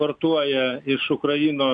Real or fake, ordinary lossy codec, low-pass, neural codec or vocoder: real; MP3, 96 kbps; 9.9 kHz; none